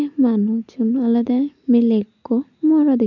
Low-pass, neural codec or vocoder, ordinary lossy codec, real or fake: 7.2 kHz; none; none; real